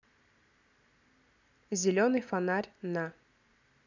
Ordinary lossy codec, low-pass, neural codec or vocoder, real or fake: none; 7.2 kHz; none; real